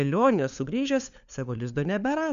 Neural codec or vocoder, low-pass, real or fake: codec, 16 kHz, 4 kbps, FunCodec, trained on LibriTTS, 50 frames a second; 7.2 kHz; fake